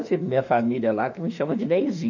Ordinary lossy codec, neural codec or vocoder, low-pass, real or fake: none; autoencoder, 48 kHz, 32 numbers a frame, DAC-VAE, trained on Japanese speech; 7.2 kHz; fake